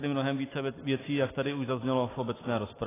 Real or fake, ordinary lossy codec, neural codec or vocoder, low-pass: real; AAC, 16 kbps; none; 3.6 kHz